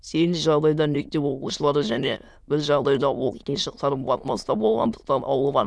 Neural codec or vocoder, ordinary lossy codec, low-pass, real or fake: autoencoder, 22.05 kHz, a latent of 192 numbers a frame, VITS, trained on many speakers; none; none; fake